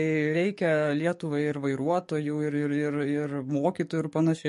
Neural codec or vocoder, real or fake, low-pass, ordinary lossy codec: codec, 44.1 kHz, 7.8 kbps, DAC; fake; 14.4 kHz; MP3, 48 kbps